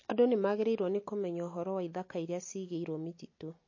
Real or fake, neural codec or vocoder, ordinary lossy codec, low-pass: real; none; MP3, 32 kbps; 7.2 kHz